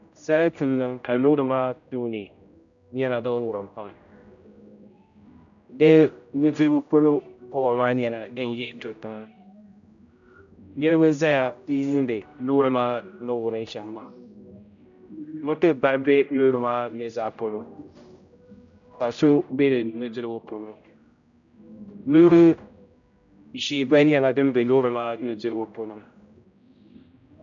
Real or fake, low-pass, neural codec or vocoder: fake; 7.2 kHz; codec, 16 kHz, 0.5 kbps, X-Codec, HuBERT features, trained on general audio